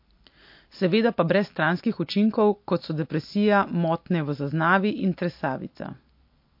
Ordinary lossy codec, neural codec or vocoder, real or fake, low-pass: MP3, 32 kbps; none; real; 5.4 kHz